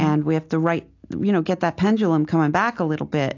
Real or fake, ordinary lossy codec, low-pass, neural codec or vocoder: real; AAC, 48 kbps; 7.2 kHz; none